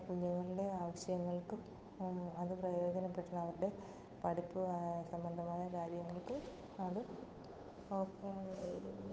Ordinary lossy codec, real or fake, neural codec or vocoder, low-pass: none; fake; codec, 16 kHz, 8 kbps, FunCodec, trained on Chinese and English, 25 frames a second; none